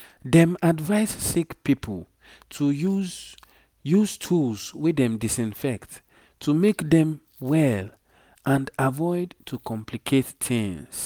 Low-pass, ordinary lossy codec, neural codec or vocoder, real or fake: none; none; none; real